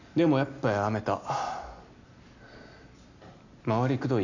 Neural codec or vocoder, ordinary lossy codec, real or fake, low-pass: none; none; real; 7.2 kHz